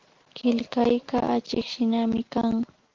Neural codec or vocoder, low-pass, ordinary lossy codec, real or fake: none; 7.2 kHz; Opus, 16 kbps; real